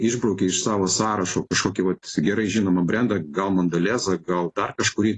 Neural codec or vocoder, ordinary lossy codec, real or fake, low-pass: none; AAC, 32 kbps; real; 10.8 kHz